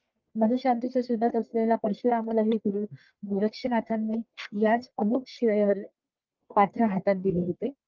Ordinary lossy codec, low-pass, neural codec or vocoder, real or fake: Opus, 32 kbps; 7.2 kHz; codec, 44.1 kHz, 1.7 kbps, Pupu-Codec; fake